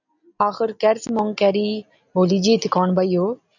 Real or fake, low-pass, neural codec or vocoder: real; 7.2 kHz; none